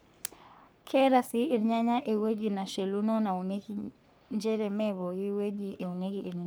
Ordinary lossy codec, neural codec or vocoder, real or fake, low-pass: none; codec, 44.1 kHz, 3.4 kbps, Pupu-Codec; fake; none